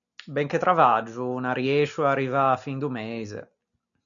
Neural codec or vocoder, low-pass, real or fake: none; 7.2 kHz; real